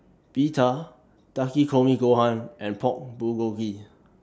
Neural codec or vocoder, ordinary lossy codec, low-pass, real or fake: none; none; none; real